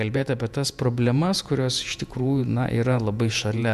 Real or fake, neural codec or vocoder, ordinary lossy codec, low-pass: fake; vocoder, 48 kHz, 128 mel bands, Vocos; MP3, 96 kbps; 14.4 kHz